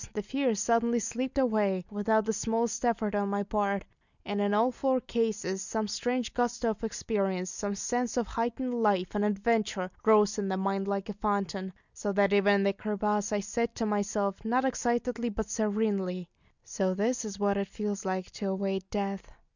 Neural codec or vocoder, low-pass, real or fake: none; 7.2 kHz; real